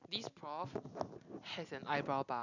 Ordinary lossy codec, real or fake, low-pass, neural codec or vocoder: none; real; 7.2 kHz; none